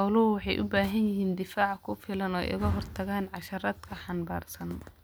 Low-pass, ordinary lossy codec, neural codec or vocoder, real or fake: none; none; none; real